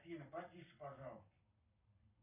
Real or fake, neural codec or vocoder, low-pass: fake; codec, 44.1 kHz, 7.8 kbps, Pupu-Codec; 3.6 kHz